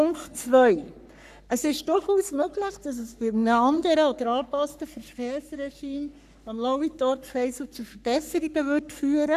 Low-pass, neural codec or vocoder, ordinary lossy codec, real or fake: 14.4 kHz; codec, 44.1 kHz, 3.4 kbps, Pupu-Codec; none; fake